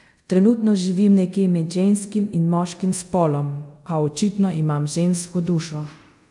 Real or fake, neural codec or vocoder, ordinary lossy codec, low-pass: fake; codec, 24 kHz, 0.5 kbps, DualCodec; none; 10.8 kHz